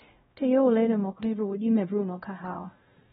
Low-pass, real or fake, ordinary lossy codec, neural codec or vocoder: 7.2 kHz; fake; AAC, 16 kbps; codec, 16 kHz, 0.5 kbps, X-Codec, WavLM features, trained on Multilingual LibriSpeech